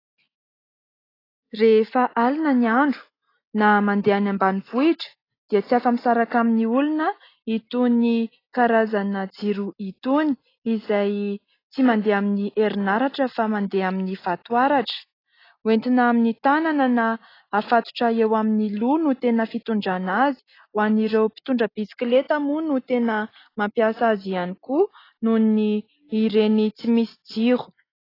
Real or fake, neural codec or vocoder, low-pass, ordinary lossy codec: real; none; 5.4 kHz; AAC, 24 kbps